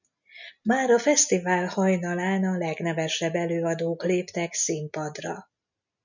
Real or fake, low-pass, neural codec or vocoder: real; 7.2 kHz; none